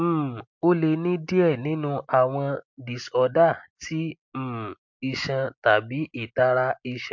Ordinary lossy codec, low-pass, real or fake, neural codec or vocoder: MP3, 48 kbps; 7.2 kHz; real; none